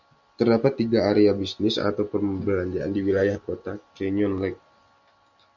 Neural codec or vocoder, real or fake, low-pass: none; real; 7.2 kHz